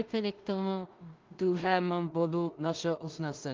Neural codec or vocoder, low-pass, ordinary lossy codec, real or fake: codec, 16 kHz in and 24 kHz out, 0.4 kbps, LongCat-Audio-Codec, two codebook decoder; 7.2 kHz; Opus, 32 kbps; fake